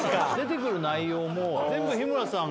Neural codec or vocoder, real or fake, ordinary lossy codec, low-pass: none; real; none; none